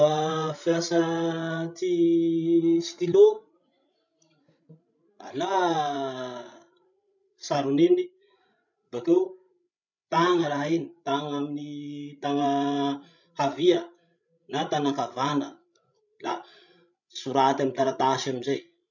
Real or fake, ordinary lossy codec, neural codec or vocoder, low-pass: fake; none; codec, 16 kHz, 16 kbps, FreqCodec, larger model; 7.2 kHz